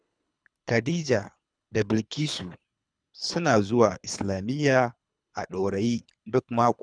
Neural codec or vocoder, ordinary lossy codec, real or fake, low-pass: codec, 24 kHz, 3 kbps, HILCodec; none; fake; 9.9 kHz